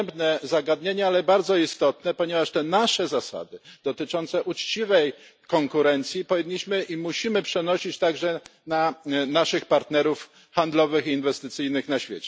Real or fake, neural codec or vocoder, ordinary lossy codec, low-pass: real; none; none; none